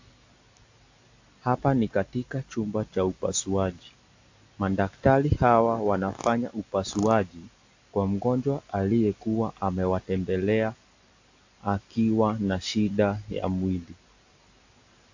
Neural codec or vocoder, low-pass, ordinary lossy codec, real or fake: none; 7.2 kHz; AAC, 48 kbps; real